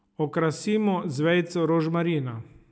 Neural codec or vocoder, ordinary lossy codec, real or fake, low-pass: none; none; real; none